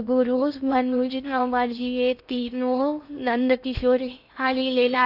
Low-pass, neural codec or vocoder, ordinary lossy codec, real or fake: 5.4 kHz; codec, 16 kHz in and 24 kHz out, 0.6 kbps, FocalCodec, streaming, 2048 codes; none; fake